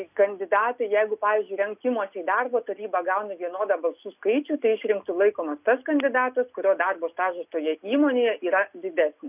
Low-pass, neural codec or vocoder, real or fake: 3.6 kHz; none; real